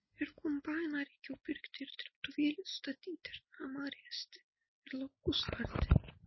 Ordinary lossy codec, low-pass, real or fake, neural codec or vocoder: MP3, 24 kbps; 7.2 kHz; real; none